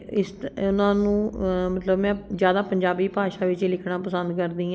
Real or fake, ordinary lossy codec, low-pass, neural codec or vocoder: real; none; none; none